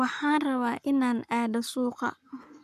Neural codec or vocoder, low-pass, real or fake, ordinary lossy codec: codec, 44.1 kHz, 7.8 kbps, Pupu-Codec; 14.4 kHz; fake; none